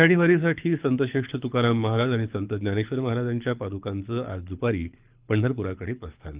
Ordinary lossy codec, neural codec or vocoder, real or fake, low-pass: Opus, 32 kbps; codec, 24 kHz, 6 kbps, HILCodec; fake; 3.6 kHz